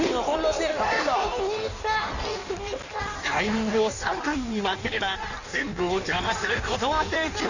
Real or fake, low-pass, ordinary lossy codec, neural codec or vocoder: fake; 7.2 kHz; none; codec, 16 kHz in and 24 kHz out, 1.1 kbps, FireRedTTS-2 codec